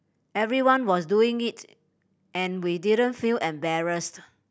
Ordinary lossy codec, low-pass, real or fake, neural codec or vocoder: none; none; real; none